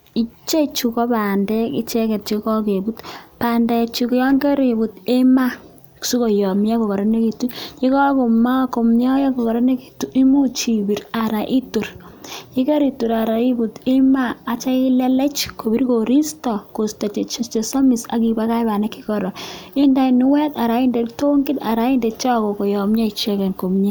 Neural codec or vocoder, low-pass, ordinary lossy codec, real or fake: none; none; none; real